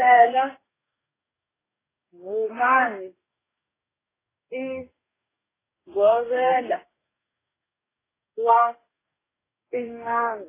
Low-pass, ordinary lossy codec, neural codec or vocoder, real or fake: 3.6 kHz; AAC, 16 kbps; codec, 44.1 kHz, 2.6 kbps, DAC; fake